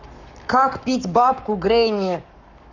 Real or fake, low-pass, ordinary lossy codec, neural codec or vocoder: fake; 7.2 kHz; none; codec, 44.1 kHz, 7.8 kbps, Pupu-Codec